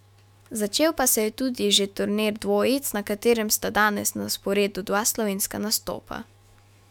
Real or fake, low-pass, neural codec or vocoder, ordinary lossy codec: fake; 19.8 kHz; autoencoder, 48 kHz, 128 numbers a frame, DAC-VAE, trained on Japanese speech; none